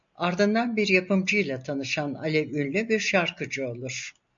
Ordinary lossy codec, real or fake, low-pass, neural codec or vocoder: MP3, 48 kbps; real; 7.2 kHz; none